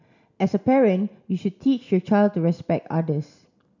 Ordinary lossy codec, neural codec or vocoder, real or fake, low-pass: none; none; real; 7.2 kHz